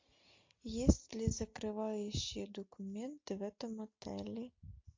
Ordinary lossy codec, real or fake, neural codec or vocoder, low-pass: MP3, 48 kbps; real; none; 7.2 kHz